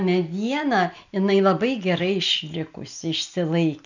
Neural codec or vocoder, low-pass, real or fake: none; 7.2 kHz; real